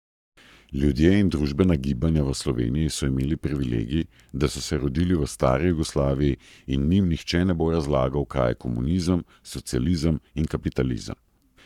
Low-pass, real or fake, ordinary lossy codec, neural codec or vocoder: 19.8 kHz; fake; none; codec, 44.1 kHz, 7.8 kbps, Pupu-Codec